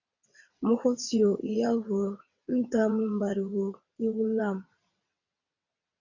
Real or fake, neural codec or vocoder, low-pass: fake; vocoder, 22.05 kHz, 80 mel bands, WaveNeXt; 7.2 kHz